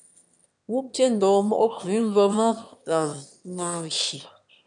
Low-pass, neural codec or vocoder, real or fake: 9.9 kHz; autoencoder, 22.05 kHz, a latent of 192 numbers a frame, VITS, trained on one speaker; fake